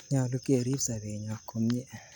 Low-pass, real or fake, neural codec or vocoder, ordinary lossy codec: none; real; none; none